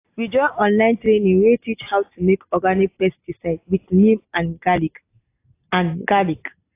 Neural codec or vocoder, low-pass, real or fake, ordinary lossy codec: none; 3.6 kHz; real; AAC, 24 kbps